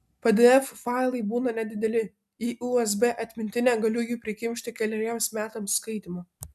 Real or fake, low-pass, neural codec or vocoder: real; 14.4 kHz; none